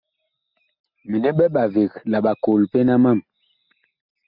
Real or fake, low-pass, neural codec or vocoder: real; 5.4 kHz; none